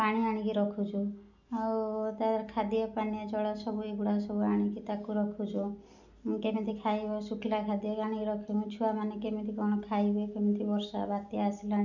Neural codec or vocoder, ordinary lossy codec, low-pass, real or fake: none; none; 7.2 kHz; real